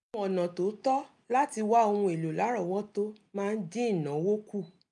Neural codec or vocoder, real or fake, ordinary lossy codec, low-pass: none; real; MP3, 96 kbps; 10.8 kHz